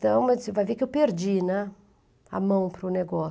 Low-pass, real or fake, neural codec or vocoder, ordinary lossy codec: none; real; none; none